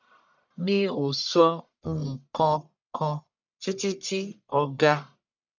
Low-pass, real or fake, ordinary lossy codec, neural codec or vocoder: 7.2 kHz; fake; none; codec, 44.1 kHz, 1.7 kbps, Pupu-Codec